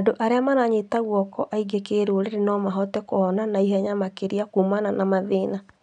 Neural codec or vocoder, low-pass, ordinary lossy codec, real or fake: none; 9.9 kHz; none; real